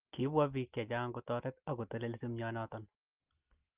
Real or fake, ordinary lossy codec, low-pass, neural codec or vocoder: real; Opus, 32 kbps; 3.6 kHz; none